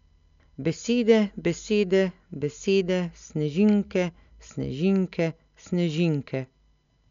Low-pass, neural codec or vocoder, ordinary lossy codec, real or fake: 7.2 kHz; none; MP3, 64 kbps; real